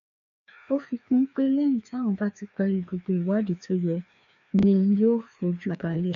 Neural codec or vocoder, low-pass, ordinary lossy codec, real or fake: codec, 16 kHz, 2 kbps, FreqCodec, larger model; 7.2 kHz; none; fake